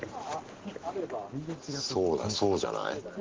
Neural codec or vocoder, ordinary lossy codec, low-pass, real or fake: none; Opus, 16 kbps; 7.2 kHz; real